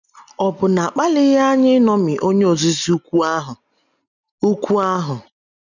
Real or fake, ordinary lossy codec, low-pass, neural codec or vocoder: real; none; 7.2 kHz; none